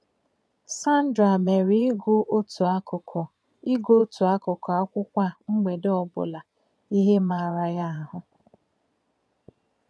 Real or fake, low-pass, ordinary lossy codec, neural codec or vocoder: fake; none; none; vocoder, 22.05 kHz, 80 mel bands, Vocos